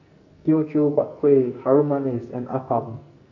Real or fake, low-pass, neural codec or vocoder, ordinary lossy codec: fake; 7.2 kHz; codec, 44.1 kHz, 2.6 kbps, SNAC; none